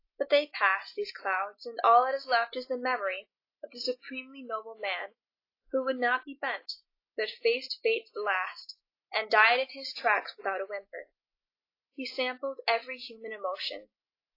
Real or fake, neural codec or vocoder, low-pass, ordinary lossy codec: real; none; 5.4 kHz; AAC, 32 kbps